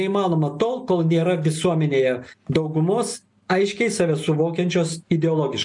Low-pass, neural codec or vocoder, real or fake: 10.8 kHz; none; real